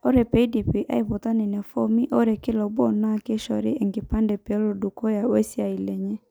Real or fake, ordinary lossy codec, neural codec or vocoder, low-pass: real; none; none; none